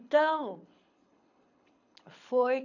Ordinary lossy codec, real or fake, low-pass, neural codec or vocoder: none; fake; 7.2 kHz; codec, 24 kHz, 6 kbps, HILCodec